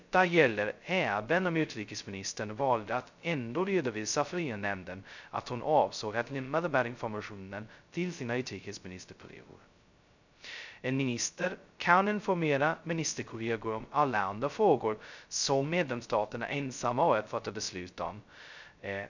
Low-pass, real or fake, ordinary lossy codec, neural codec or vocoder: 7.2 kHz; fake; none; codec, 16 kHz, 0.2 kbps, FocalCodec